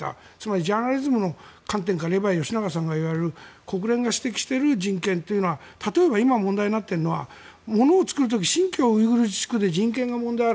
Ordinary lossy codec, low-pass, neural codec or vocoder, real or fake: none; none; none; real